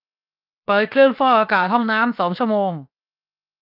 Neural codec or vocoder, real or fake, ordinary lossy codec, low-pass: codec, 16 kHz, 0.7 kbps, FocalCodec; fake; none; 5.4 kHz